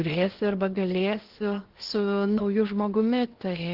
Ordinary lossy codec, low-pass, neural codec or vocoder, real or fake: Opus, 16 kbps; 5.4 kHz; codec, 16 kHz in and 24 kHz out, 0.8 kbps, FocalCodec, streaming, 65536 codes; fake